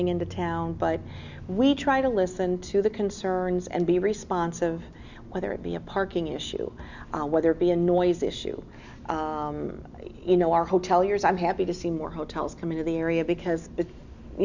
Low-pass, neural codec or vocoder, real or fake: 7.2 kHz; none; real